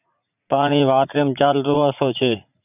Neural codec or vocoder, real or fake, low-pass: vocoder, 44.1 kHz, 80 mel bands, Vocos; fake; 3.6 kHz